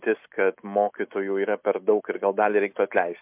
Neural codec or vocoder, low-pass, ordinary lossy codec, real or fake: none; 3.6 kHz; MP3, 32 kbps; real